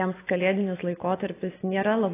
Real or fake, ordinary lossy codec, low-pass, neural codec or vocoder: real; AAC, 16 kbps; 3.6 kHz; none